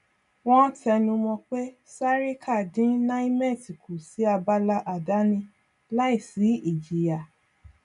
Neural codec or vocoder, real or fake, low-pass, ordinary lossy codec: none; real; 10.8 kHz; none